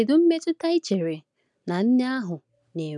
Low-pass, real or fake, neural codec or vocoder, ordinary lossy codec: 10.8 kHz; real; none; none